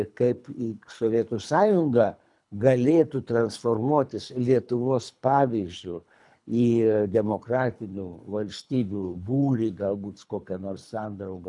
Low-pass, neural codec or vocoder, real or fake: 10.8 kHz; codec, 24 kHz, 3 kbps, HILCodec; fake